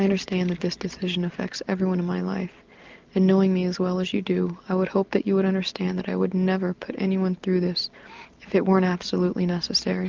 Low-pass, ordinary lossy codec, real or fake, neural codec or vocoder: 7.2 kHz; Opus, 24 kbps; real; none